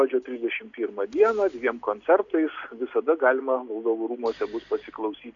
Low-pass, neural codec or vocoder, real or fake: 7.2 kHz; none; real